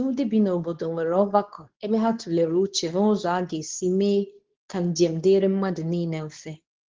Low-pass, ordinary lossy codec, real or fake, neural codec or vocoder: 7.2 kHz; Opus, 16 kbps; fake; codec, 24 kHz, 0.9 kbps, WavTokenizer, medium speech release version 2